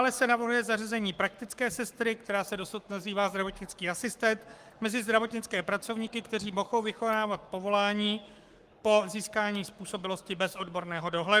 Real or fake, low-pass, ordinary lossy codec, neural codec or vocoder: fake; 14.4 kHz; Opus, 32 kbps; codec, 44.1 kHz, 7.8 kbps, Pupu-Codec